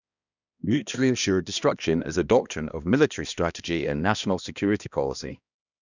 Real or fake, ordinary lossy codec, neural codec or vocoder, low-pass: fake; none; codec, 16 kHz, 1 kbps, X-Codec, HuBERT features, trained on balanced general audio; 7.2 kHz